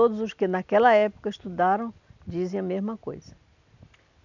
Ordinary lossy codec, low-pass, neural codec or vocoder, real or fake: none; 7.2 kHz; none; real